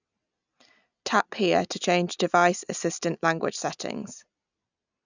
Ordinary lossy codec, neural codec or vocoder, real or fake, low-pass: none; none; real; 7.2 kHz